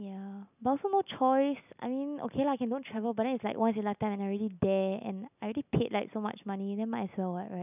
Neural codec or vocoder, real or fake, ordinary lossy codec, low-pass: none; real; none; 3.6 kHz